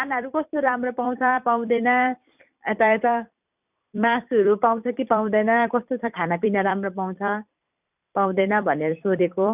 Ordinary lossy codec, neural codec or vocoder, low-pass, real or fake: none; vocoder, 44.1 kHz, 128 mel bands every 256 samples, BigVGAN v2; 3.6 kHz; fake